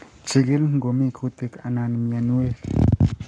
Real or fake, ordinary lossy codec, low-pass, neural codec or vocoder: fake; none; 9.9 kHz; codec, 44.1 kHz, 7.8 kbps, Pupu-Codec